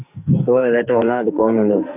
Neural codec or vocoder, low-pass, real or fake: codec, 44.1 kHz, 2.6 kbps, SNAC; 3.6 kHz; fake